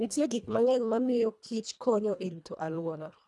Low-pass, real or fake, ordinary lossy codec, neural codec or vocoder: none; fake; none; codec, 24 kHz, 1.5 kbps, HILCodec